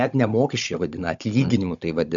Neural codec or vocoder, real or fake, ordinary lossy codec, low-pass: codec, 16 kHz, 16 kbps, FunCodec, trained on Chinese and English, 50 frames a second; fake; MP3, 64 kbps; 7.2 kHz